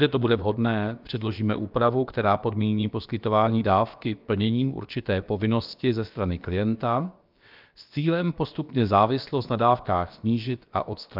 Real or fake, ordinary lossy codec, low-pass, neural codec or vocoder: fake; Opus, 24 kbps; 5.4 kHz; codec, 16 kHz, about 1 kbps, DyCAST, with the encoder's durations